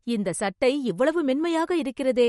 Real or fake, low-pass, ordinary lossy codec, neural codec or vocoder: real; 19.8 kHz; MP3, 48 kbps; none